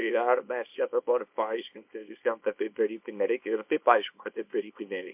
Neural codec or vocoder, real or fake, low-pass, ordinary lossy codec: codec, 24 kHz, 0.9 kbps, WavTokenizer, small release; fake; 3.6 kHz; MP3, 32 kbps